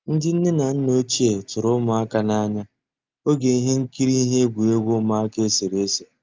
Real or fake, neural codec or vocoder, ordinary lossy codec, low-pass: real; none; Opus, 32 kbps; 7.2 kHz